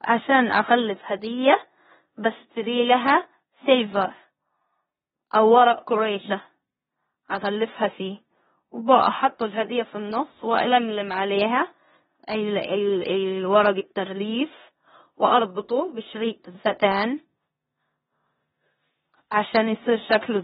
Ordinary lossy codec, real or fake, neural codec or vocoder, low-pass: AAC, 16 kbps; fake; codec, 16 kHz in and 24 kHz out, 0.9 kbps, LongCat-Audio-Codec, four codebook decoder; 10.8 kHz